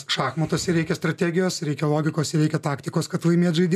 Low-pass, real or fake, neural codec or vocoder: 14.4 kHz; real; none